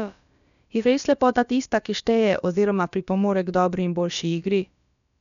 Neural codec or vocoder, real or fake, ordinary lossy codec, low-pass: codec, 16 kHz, about 1 kbps, DyCAST, with the encoder's durations; fake; none; 7.2 kHz